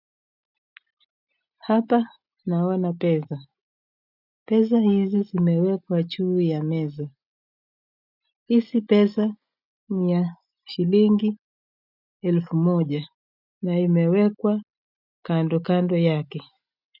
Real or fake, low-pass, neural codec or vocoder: real; 5.4 kHz; none